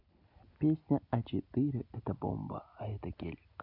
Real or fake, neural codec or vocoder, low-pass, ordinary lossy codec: real; none; 5.4 kHz; none